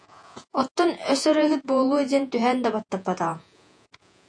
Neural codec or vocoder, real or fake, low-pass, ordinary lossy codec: vocoder, 48 kHz, 128 mel bands, Vocos; fake; 9.9 kHz; AAC, 64 kbps